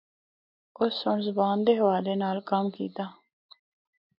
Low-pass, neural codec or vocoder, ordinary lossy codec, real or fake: 5.4 kHz; none; MP3, 48 kbps; real